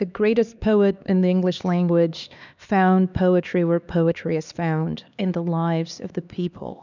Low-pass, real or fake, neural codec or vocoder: 7.2 kHz; fake; codec, 16 kHz, 2 kbps, X-Codec, HuBERT features, trained on LibriSpeech